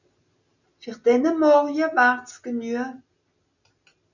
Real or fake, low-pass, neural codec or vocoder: real; 7.2 kHz; none